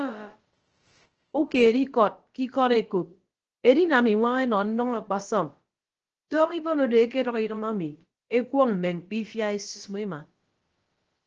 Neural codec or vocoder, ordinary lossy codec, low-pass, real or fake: codec, 16 kHz, about 1 kbps, DyCAST, with the encoder's durations; Opus, 16 kbps; 7.2 kHz; fake